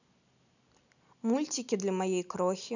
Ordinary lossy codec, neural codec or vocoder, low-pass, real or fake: none; none; 7.2 kHz; real